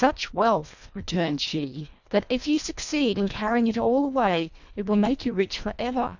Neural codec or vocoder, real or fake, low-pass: codec, 24 kHz, 1.5 kbps, HILCodec; fake; 7.2 kHz